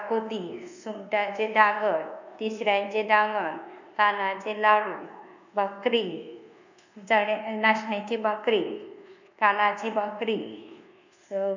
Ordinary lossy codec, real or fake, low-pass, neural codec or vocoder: none; fake; 7.2 kHz; codec, 24 kHz, 1.2 kbps, DualCodec